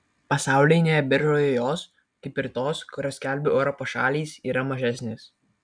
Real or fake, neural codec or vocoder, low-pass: real; none; 9.9 kHz